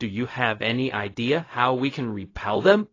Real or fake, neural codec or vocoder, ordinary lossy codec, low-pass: fake; codec, 16 kHz, 0.4 kbps, LongCat-Audio-Codec; AAC, 32 kbps; 7.2 kHz